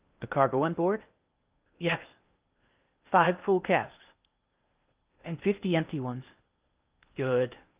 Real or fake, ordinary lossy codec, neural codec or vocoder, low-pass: fake; Opus, 32 kbps; codec, 16 kHz in and 24 kHz out, 0.8 kbps, FocalCodec, streaming, 65536 codes; 3.6 kHz